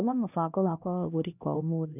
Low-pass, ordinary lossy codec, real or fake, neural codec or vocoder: 3.6 kHz; none; fake; codec, 16 kHz, 1 kbps, X-Codec, HuBERT features, trained on LibriSpeech